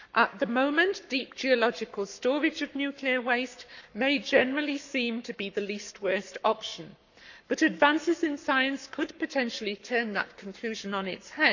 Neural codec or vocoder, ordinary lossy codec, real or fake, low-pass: codec, 44.1 kHz, 7.8 kbps, Pupu-Codec; none; fake; 7.2 kHz